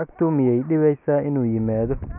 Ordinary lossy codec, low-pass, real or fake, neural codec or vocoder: none; 3.6 kHz; real; none